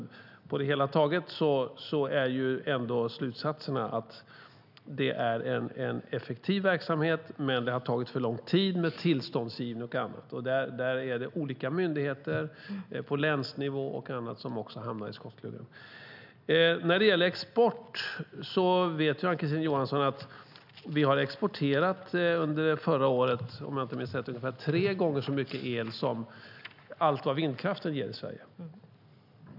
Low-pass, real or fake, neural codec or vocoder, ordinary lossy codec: 5.4 kHz; real; none; none